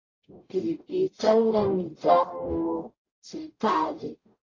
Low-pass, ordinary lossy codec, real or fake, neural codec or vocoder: 7.2 kHz; AAC, 32 kbps; fake; codec, 44.1 kHz, 0.9 kbps, DAC